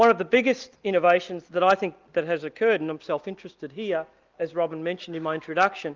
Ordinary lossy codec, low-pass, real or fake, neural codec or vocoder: Opus, 32 kbps; 7.2 kHz; real; none